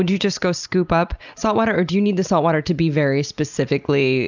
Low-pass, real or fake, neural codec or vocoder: 7.2 kHz; real; none